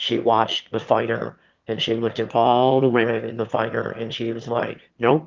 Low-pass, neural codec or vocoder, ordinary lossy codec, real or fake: 7.2 kHz; autoencoder, 22.05 kHz, a latent of 192 numbers a frame, VITS, trained on one speaker; Opus, 24 kbps; fake